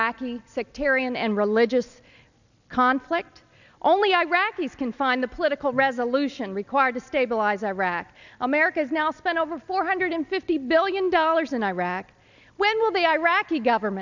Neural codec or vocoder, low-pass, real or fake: none; 7.2 kHz; real